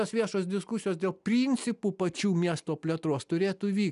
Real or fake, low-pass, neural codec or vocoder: real; 10.8 kHz; none